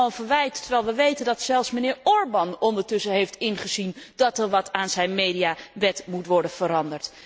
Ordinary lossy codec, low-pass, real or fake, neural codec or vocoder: none; none; real; none